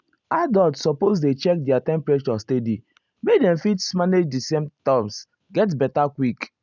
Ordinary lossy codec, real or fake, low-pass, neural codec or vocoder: none; real; 7.2 kHz; none